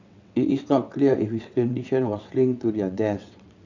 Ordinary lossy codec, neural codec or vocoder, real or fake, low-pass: none; vocoder, 22.05 kHz, 80 mel bands, WaveNeXt; fake; 7.2 kHz